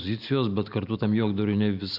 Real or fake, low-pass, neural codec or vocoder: real; 5.4 kHz; none